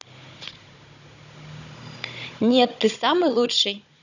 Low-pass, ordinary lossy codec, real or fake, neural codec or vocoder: 7.2 kHz; none; fake; codec, 16 kHz, 16 kbps, FunCodec, trained on Chinese and English, 50 frames a second